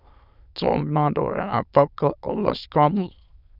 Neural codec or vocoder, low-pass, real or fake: autoencoder, 22.05 kHz, a latent of 192 numbers a frame, VITS, trained on many speakers; 5.4 kHz; fake